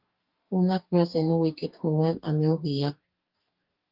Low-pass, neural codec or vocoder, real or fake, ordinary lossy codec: 5.4 kHz; codec, 44.1 kHz, 2.6 kbps, DAC; fake; Opus, 24 kbps